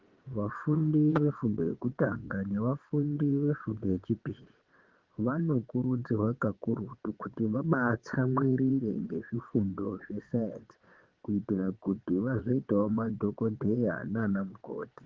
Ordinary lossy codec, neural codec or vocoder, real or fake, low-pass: Opus, 16 kbps; vocoder, 22.05 kHz, 80 mel bands, WaveNeXt; fake; 7.2 kHz